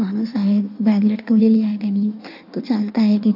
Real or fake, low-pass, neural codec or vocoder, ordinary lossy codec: fake; 5.4 kHz; codec, 16 kHz in and 24 kHz out, 1.1 kbps, FireRedTTS-2 codec; AAC, 48 kbps